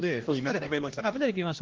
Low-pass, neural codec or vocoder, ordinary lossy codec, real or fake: 7.2 kHz; codec, 16 kHz, 1 kbps, X-Codec, HuBERT features, trained on LibriSpeech; Opus, 16 kbps; fake